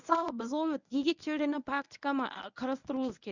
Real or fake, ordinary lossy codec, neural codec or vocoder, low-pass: fake; none; codec, 24 kHz, 0.9 kbps, WavTokenizer, medium speech release version 1; 7.2 kHz